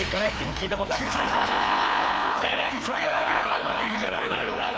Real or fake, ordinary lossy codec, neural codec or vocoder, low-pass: fake; none; codec, 16 kHz, 2 kbps, FreqCodec, larger model; none